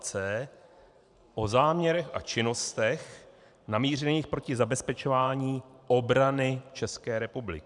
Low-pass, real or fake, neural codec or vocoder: 10.8 kHz; fake; vocoder, 44.1 kHz, 128 mel bands every 512 samples, BigVGAN v2